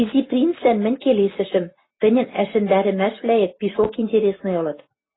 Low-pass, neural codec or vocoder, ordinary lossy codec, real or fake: 7.2 kHz; none; AAC, 16 kbps; real